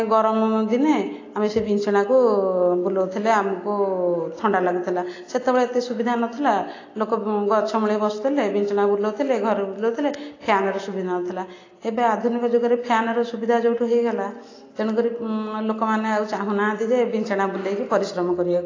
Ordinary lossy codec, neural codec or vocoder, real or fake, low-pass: AAC, 32 kbps; none; real; 7.2 kHz